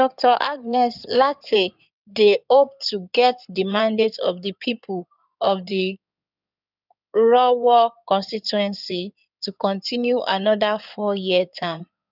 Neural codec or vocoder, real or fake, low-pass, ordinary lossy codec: codec, 16 kHz in and 24 kHz out, 2.2 kbps, FireRedTTS-2 codec; fake; 5.4 kHz; none